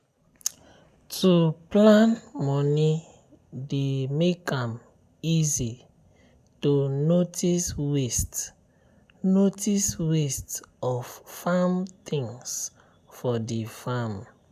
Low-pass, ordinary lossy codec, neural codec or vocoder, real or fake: 14.4 kHz; none; none; real